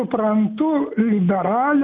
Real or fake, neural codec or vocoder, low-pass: real; none; 7.2 kHz